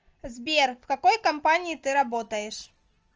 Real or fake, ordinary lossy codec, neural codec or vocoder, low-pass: real; Opus, 24 kbps; none; 7.2 kHz